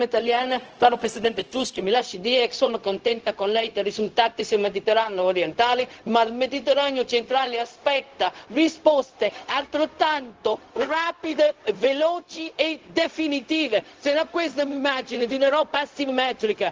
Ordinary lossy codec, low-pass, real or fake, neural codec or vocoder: Opus, 16 kbps; 7.2 kHz; fake; codec, 16 kHz, 0.4 kbps, LongCat-Audio-Codec